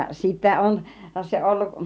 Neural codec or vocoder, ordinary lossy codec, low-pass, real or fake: none; none; none; real